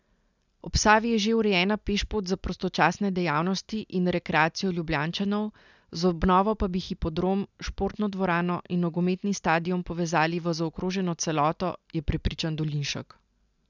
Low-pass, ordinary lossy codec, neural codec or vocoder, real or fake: 7.2 kHz; none; none; real